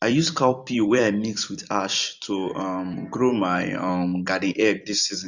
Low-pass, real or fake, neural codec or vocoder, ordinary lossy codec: 7.2 kHz; fake; vocoder, 44.1 kHz, 128 mel bands every 256 samples, BigVGAN v2; none